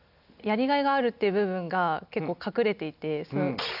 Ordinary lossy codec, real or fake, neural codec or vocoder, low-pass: none; real; none; 5.4 kHz